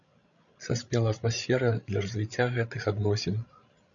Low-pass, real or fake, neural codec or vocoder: 7.2 kHz; fake; codec, 16 kHz, 16 kbps, FreqCodec, larger model